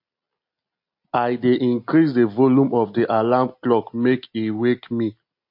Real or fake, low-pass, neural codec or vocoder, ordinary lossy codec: real; 5.4 kHz; none; MP3, 32 kbps